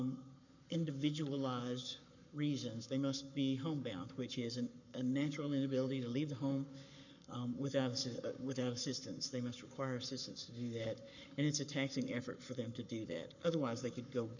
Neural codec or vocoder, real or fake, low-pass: codec, 44.1 kHz, 7.8 kbps, Pupu-Codec; fake; 7.2 kHz